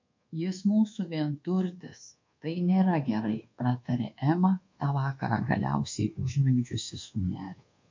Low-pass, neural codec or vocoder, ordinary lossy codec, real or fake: 7.2 kHz; codec, 24 kHz, 1.2 kbps, DualCodec; MP3, 48 kbps; fake